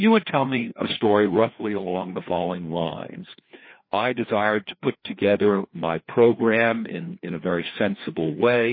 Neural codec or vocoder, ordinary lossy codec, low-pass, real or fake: codec, 16 kHz, 2 kbps, FreqCodec, larger model; MP3, 24 kbps; 5.4 kHz; fake